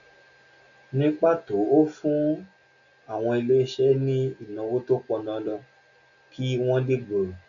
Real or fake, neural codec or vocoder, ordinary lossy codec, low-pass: real; none; none; 7.2 kHz